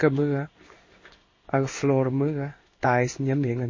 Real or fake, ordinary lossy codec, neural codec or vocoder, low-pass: fake; MP3, 32 kbps; codec, 16 kHz in and 24 kHz out, 1 kbps, XY-Tokenizer; 7.2 kHz